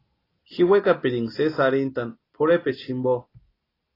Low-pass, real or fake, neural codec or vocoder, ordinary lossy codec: 5.4 kHz; real; none; AAC, 24 kbps